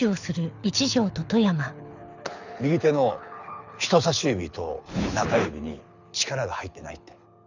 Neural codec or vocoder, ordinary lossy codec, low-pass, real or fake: codec, 24 kHz, 6 kbps, HILCodec; none; 7.2 kHz; fake